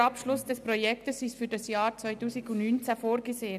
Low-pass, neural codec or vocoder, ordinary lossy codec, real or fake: 14.4 kHz; none; none; real